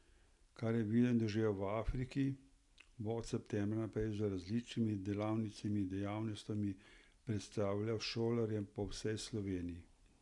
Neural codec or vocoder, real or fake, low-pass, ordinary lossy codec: none; real; 10.8 kHz; none